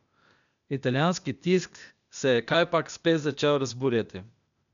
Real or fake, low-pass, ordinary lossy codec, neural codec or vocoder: fake; 7.2 kHz; none; codec, 16 kHz, 0.8 kbps, ZipCodec